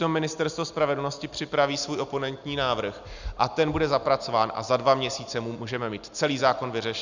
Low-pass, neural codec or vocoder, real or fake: 7.2 kHz; none; real